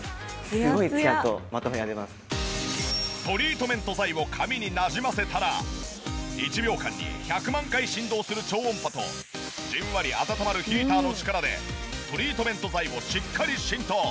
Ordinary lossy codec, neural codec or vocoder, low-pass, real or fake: none; none; none; real